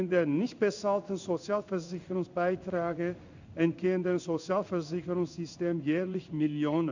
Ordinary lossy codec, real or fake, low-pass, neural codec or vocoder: none; fake; 7.2 kHz; codec, 16 kHz in and 24 kHz out, 1 kbps, XY-Tokenizer